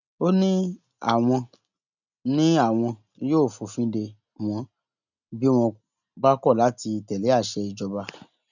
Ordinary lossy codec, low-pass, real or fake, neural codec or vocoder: none; 7.2 kHz; real; none